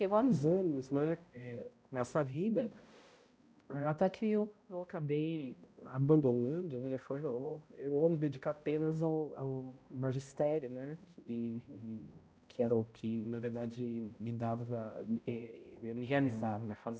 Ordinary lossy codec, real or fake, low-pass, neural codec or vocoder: none; fake; none; codec, 16 kHz, 0.5 kbps, X-Codec, HuBERT features, trained on balanced general audio